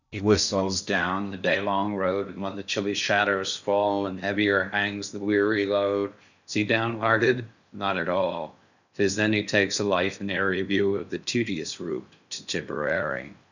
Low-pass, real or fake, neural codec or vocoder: 7.2 kHz; fake; codec, 16 kHz in and 24 kHz out, 0.6 kbps, FocalCodec, streaming, 4096 codes